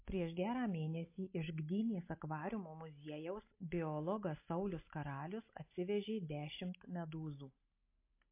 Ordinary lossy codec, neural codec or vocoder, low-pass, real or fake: MP3, 24 kbps; none; 3.6 kHz; real